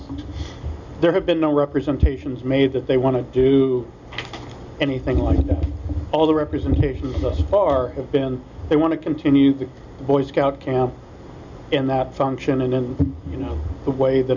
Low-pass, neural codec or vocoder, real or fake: 7.2 kHz; none; real